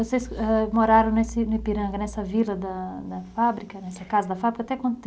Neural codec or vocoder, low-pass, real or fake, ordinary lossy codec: none; none; real; none